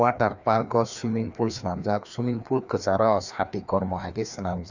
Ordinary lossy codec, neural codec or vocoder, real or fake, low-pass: none; codec, 16 kHz, 2 kbps, FreqCodec, larger model; fake; 7.2 kHz